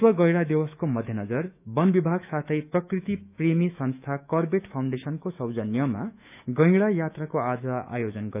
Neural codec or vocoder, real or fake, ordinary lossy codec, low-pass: autoencoder, 48 kHz, 128 numbers a frame, DAC-VAE, trained on Japanese speech; fake; none; 3.6 kHz